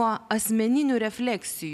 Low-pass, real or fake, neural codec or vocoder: 14.4 kHz; real; none